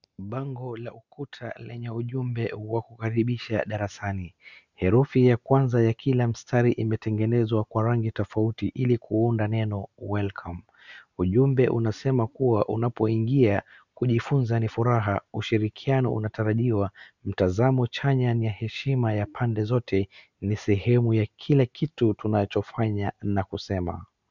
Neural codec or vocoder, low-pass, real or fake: none; 7.2 kHz; real